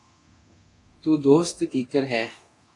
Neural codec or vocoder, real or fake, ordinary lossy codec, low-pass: codec, 24 kHz, 0.9 kbps, DualCodec; fake; AAC, 48 kbps; 10.8 kHz